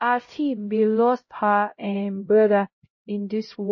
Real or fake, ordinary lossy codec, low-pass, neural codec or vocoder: fake; MP3, 32 kbps; 7.2 kHz; codec, 16 kHz, 0.5 kbps, X-Codec, HuBERT features, trained on LibriSpeech